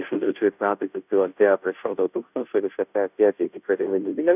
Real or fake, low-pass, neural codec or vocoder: fake; 3.6 kHz; codec, 16 kHz, 0.5 kbps, FunCodec, trained on Chinese and English, 25 frames a second